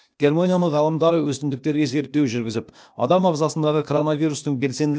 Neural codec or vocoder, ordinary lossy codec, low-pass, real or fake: codec, 16 kHz, 0.8 kbps, ZipCodec; none; none; fake